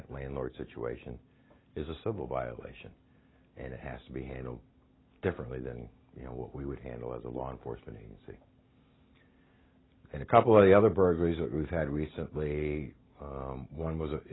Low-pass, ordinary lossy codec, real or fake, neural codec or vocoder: 7.2 kHz; AAC, 16 kbps; real; none